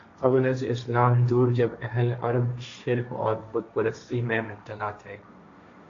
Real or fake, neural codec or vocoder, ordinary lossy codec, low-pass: fake; codec, 16 kHz, 1.1 kbps, Voila-Tokenizer; AAC, 48 kbps; 7.2 kHz